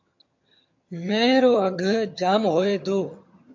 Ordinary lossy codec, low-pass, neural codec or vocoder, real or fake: MP3, 48 kbps; 7.2 kHz; vocoder, 22.05 kHz, 80 mel bands, HiFi-GAN; fake